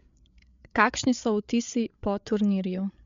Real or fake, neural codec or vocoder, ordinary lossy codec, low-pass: fake; codec, 16 kHz, 8 kbps, FreqCodec, larger model; MP3, 64 kbps; 7.2 kHz